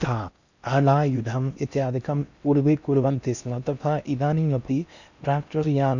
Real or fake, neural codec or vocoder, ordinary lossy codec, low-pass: fake; codec, 16 kHz in and 24 kHz out, 0.6 kbps, FocalCodec, streaming, 4096 codes; none; 7.2 kHz